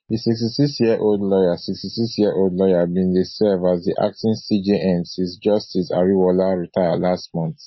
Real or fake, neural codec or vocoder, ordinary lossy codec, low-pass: real; none; MP3, 24 kbps; 7.2 kHz